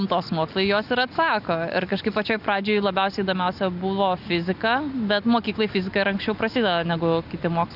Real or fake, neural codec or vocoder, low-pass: real; none; 5.4 kHz